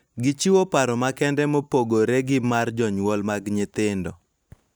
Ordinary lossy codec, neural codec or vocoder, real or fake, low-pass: none; none; real; none